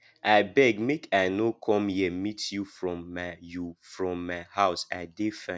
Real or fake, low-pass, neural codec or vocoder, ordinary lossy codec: real; none; none; none